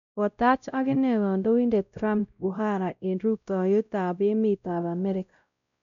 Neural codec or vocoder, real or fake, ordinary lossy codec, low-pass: codec, 16 kHz, 0.5 kbps, X-Codec, WavLM features, trained on Multilingual LibriSpeech; fake; none; 7.2 kHz